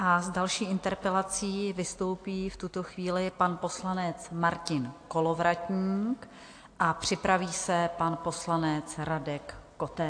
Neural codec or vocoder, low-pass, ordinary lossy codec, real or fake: none; 9.9 kHz; AAC, 48 kbps; real